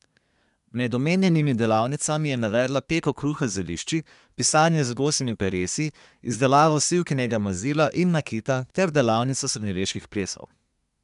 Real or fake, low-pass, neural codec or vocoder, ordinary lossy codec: fake; 10.8 kHz; codec, 24 kHz, 1 kbps, SNAC; none